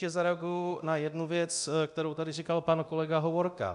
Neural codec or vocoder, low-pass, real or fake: codec, 24 kHz, 0.9 kbps, DualCodec; 10.8 kHz; fake